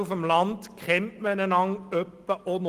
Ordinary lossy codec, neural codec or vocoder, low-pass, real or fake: Opus, 24 kbps; none; 14.4 kHz; real